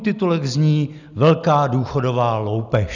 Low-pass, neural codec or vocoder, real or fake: 7.2 kHz; none; real